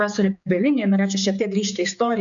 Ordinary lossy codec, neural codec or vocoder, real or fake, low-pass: AAC, 64 kbps; codec, 16 kHz, 4 kbps, X-Codec, HuBERT features, trained on general audio; fake; 7.2 kHz